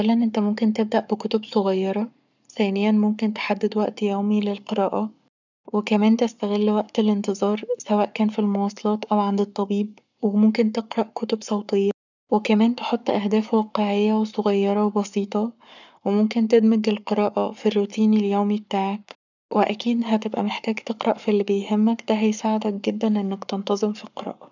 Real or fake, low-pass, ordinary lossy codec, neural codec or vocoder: fake; 7.2 kHz; none; codec, 44.1 kHz, 7.8 kbps, Pupu-Codec